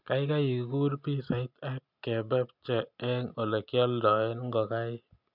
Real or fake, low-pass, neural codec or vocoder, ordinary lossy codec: real; 5.4 kHz; none; none